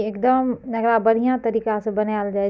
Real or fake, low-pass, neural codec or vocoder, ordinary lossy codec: real; none; none; none